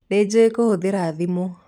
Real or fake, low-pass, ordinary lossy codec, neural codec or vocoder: fake; 19.8 kHz; none; vocoder, 44.1 kHz, 128 mel bands every 512 samples, BigVGAN v2